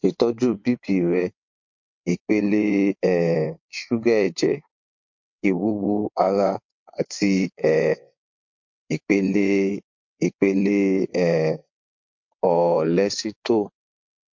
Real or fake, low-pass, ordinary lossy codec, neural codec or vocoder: fake; 7.2 kHz; MP3, 48 kbps; vocoder, 22.05 kHz, 80 mel bands, WaveNeXt